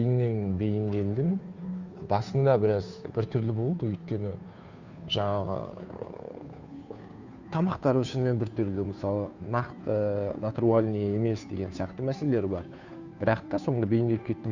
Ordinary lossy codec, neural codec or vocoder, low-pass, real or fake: Opus, 64 kbps; codec, 16 kHz, 2 kbps, FunCodec, trained on Chinese and English, 25 frames a second; 7.2 kHz; fake